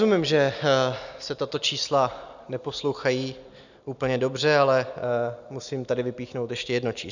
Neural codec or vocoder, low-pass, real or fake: none; 7.2 kHz; real